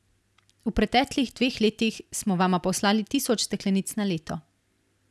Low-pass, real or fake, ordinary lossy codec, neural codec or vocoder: none; real; none; none